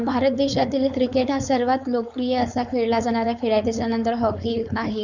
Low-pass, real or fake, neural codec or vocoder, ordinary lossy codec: 7.2 kHz; fake; codec, 16 kHz, 4.8 kbps, FACodec; none